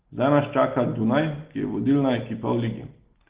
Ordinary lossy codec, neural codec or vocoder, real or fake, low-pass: Opus, 16 kbps; none; real; 3.6 kHz